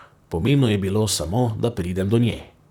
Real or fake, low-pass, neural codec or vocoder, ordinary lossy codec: fake; 19.8 kHz; vocoder, 44.1 kHz, 128 mel bands, Pupu-Vocoder; none